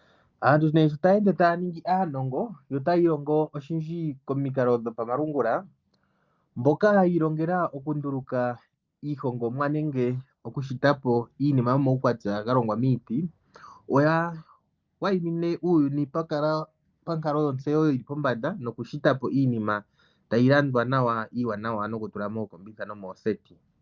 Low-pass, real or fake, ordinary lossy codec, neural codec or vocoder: 7.2 kHz; real; Opus, 24 kbps; none